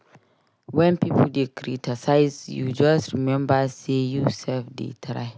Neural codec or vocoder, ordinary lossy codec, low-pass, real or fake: none; none; none; real